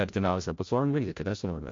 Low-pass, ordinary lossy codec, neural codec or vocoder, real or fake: 7.2 kHz; MP3, 48 kbps; codec, 16 kHz, 0.5 kbps, FreqCodec, larger model; fake